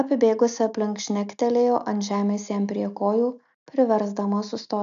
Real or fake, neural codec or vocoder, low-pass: real; none; 7.2 kHz